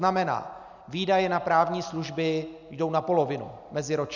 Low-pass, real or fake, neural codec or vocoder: 7.2 kHz; real; none